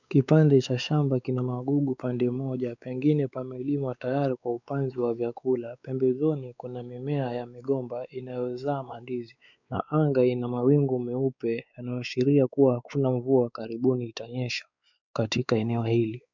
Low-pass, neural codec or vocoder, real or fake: 7.2 kHz; codec, 16 kHz, 4 kbps, X-Codec, WavLM features, trained on Multilingual LibriSpeech; fake